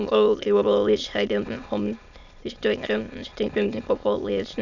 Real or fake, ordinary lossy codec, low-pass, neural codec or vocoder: fake; none; 7.2 kHz; autoencoder, 22.05 kHz, a latent of 192 numbers a frame, VITS, trained on many speakers